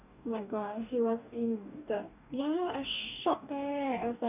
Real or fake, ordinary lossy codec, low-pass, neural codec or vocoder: fake; none; 3.6 kHz; codec, 44.1 kHz, 2.6 kbps, DAC